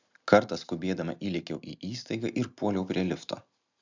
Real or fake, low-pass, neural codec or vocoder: real; 7.2 kHz; none